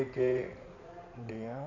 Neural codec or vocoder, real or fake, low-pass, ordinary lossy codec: codec, 16 kHz in and 24 kHz out, 2.2 kbps, FireRedTTS-2 codec; fake; 7.2 kHz; none